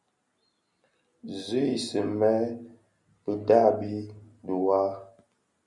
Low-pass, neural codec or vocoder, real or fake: 10.8 kHz; none; real